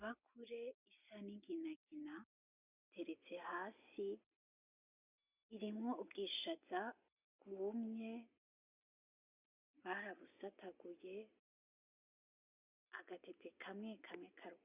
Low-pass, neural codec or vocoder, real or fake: 3.6 kHz; none; real